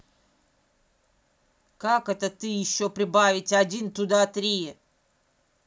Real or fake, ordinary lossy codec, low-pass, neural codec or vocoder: real; none; none; none